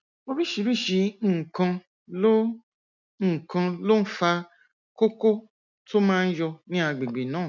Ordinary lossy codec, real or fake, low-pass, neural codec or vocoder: none; real; 7.2 kHz; none